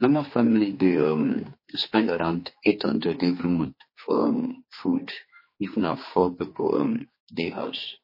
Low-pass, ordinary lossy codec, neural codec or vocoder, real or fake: 5.4 kHz; MP3, 24 kbps; codec, 16 kHz, 2 kbps, X-Codec, HuBERT features, trained on general audio; fake